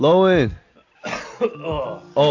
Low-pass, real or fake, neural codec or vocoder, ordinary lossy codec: 7.2 kHz; real; none; AAC, 48 kbps